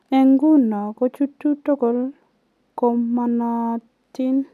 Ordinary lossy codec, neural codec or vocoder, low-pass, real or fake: none; none; 14.4 kHz; real